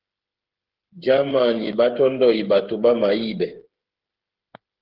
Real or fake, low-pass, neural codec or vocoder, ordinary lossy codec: fake; 5.4 kHz; codec, 16 kHz, 8 kbps, FreqCodec, smaller model; Opus, 16 kbps